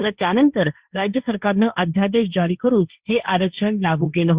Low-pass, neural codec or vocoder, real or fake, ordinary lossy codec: 3.6 kHz; codec, 16 kHz, 1.1 kbps, Voila-Tokenizer; fake; Opus, 64 kbps